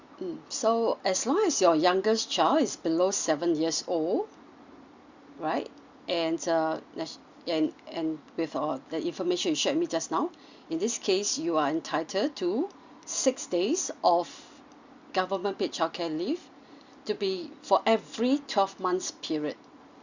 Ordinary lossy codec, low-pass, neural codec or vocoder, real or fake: Opus, 64 kbps; 7.2 kHz; none; real